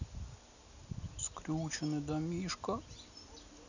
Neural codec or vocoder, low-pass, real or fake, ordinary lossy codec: none; 7.2 kHz; real; none